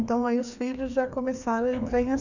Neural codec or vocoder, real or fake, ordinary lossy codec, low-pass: codec, 16 kHz, 2 kbps, FreqCodec, larger model; fake; none; 7.2 kHz